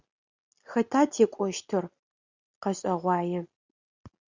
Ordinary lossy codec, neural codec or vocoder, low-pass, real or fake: Opus, 64 kbps; none; 7.2 kHz; real